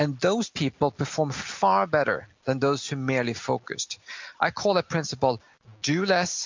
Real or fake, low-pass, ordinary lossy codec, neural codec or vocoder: real; 7.2 kHz; MP3, 64 kbps; none